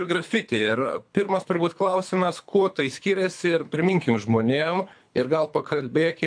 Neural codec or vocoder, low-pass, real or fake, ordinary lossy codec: codec, 24 kHz, 3 kbps, HILCodec; 9.9 kHz; fake; AAC, 64 kbps